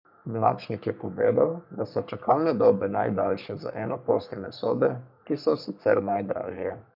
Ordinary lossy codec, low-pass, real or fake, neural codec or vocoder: none; 5.4 kHz; fake; codec, 44.1 kHz, 3.4 kbps, Pupu-Codec